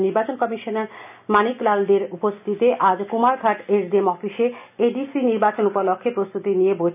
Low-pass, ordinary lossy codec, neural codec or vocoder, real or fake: 3.6 kHz; none; none; real